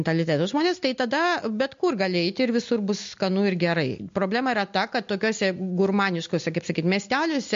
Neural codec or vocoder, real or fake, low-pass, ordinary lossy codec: none; real; 7.2 kHz; MP3, 48 kbps